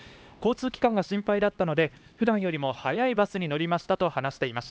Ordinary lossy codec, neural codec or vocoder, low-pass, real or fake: none; codec, 16 kHz, 2 kbps, X-Codec, HuBERT features, trained on LibriSpeech; none; fake